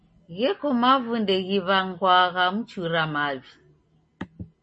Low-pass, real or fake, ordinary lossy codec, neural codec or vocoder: 10.8 kHz; real; MP3, 32 kbps; none